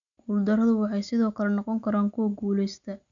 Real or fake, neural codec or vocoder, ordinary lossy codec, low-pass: real; none; none; 7.2 kHz